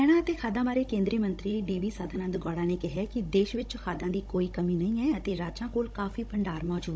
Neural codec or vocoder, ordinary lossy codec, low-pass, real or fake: codec, 16 kHz, 16 kbps, FunCodec, trained on Chinese and English, 50 frames a second; none; none; fake